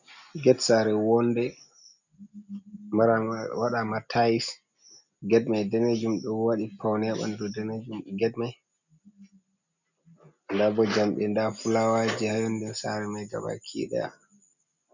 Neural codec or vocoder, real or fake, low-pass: none; real; 7.2 kHz